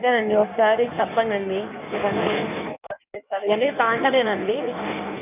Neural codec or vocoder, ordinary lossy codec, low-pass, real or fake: codec, 16 kHz in and 24 kHz out, 1.1 kbps, FireRedTTS-2 codec; none; 3.6 kHz; fake